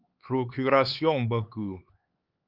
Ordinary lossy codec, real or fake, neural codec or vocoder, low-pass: Opus, 24 kbps; fake; codec, 16 kHz, 4 kbps, X-Codec, HuBERT features, trained on LibriSpeech; 5.4 kHz